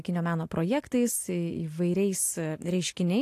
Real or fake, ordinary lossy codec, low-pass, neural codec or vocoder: real; AAC, 64 kbps; 14.4 kHz; none